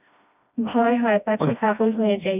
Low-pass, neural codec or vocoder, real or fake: 3.6 kHz; codec, 16 kHz, 1 kbps, FreqCodec, smaller model; fake